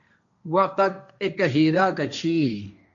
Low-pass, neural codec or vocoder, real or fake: 7.2 kHz; codec, 16 kHz, 1.1 kbps, Voila-Tokenizer; fake